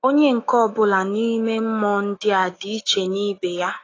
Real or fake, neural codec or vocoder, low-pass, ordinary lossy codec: fake; autoencoder, 48 kHz, 128 numbers a frame, DAC-VAE, trained on Japanese speech; 7.2 kHz; AAC, 32 kbps